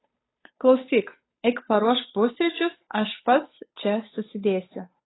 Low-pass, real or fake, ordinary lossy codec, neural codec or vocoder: 7.2 kHz; fake; AAC, 16 kbps; codec, 16 kHz, 8 kbps, FunCodec, trained on Chinese and English, 25 frames a second